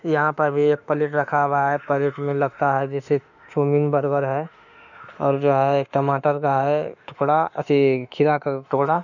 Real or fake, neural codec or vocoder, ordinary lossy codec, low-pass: fake; autoencoder, 48 kHz, 32 numbers a frame, DAC-VAE, trained on Japanese speech; none; 7.2 kHz